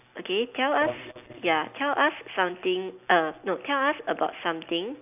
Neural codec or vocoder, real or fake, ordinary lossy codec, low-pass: none; real; none; 3.6 kHz